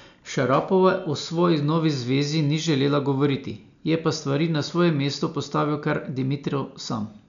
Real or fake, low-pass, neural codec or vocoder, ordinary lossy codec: real; 7.2 kHz; none; none